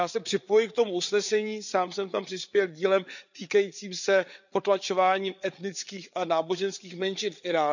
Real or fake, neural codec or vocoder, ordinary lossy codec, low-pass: fake; codec, 16 kHz, 8 kbps, FreqCodec, larger model; none; 7.2 kHz